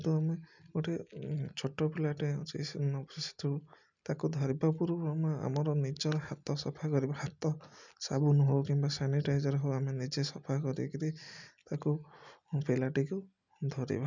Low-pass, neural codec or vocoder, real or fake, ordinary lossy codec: 7.2 kHz; none; real; none